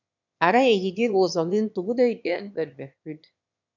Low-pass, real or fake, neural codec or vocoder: 7.2 kHz; fake; autoencoder, 22.05 kHz, a latent of 192 numbers a frame, VITS, trained on one speaker